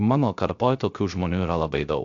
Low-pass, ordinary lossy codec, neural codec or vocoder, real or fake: 7.2 kHz; AAC, 64 kbps; codec, 16 kHz, 0.3 kbps, FocalCodec; fake